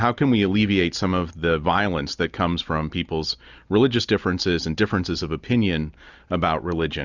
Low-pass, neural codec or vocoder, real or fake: 7.2 kHz; none; real